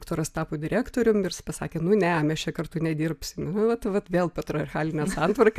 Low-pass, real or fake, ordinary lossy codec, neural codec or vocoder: 14.4 kHz; real; AAC, 96 kbps; none